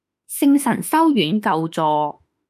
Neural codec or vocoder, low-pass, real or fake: autoencoder, 48 kHz, 32 numbers a frame, DAC-VAE, trained on Japanese speech; 14.4 kHz; fake